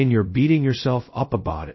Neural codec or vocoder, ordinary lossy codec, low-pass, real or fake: codec, 16 kHz, 0.2 kbps, FocalCodec; MP3, 24 kbps; 7.2 kHz; fake